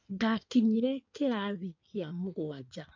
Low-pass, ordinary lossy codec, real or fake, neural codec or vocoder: 7.2 kHz; AAC, 48 kbps; fake; codec, 16 kHz in and 24 kHz out, 1.1 kbps, FireRedTTS-2 codec